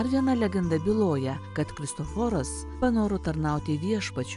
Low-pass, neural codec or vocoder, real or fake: 10.8 kHz; none; real